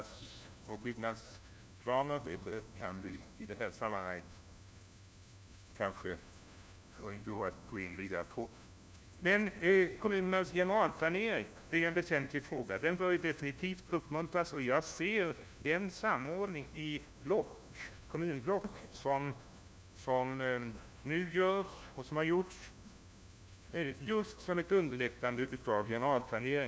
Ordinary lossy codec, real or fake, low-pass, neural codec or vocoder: none; fake; none; codec, 16 kHz, 1 kbps, FunCodec, trained on LibriTTS, 50 frames a second